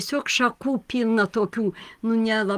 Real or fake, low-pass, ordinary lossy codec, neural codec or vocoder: real; 14.4 kHz; Opus, 32 kbps; none